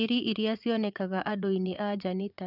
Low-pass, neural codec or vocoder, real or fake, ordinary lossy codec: 5.4 kHz; vocoder, 22.05 kHz, 80 mel bands, Vocos; fake; none